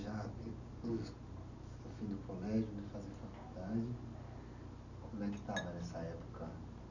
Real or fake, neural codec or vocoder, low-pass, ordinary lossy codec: real; none; 7.2 kHz; none